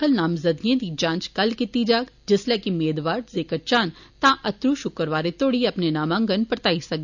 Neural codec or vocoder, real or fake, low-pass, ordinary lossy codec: none; real; 7.2 kHz; none